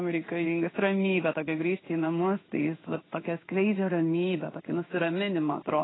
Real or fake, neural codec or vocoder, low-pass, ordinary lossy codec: fake; codec, 16 kHz in and 24 kHz out, 1 kbps, XY-Tokenizer; 7.2 kHz; AAC, 16 kbps